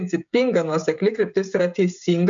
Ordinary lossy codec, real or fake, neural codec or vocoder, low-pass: MP3, 64 kbps; fake; codec, 16 kHz, 16 kbps, FreqCodec, smaller model; 7.2 kHz